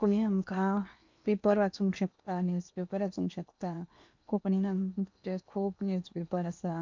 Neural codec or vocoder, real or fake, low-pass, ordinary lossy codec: codec, 16 kHz in and 24 kHz out, 0.8 kbps, FocalCodec, streaming, 65536 codes; fake; 7.2 kHz; MP3, 64 kbps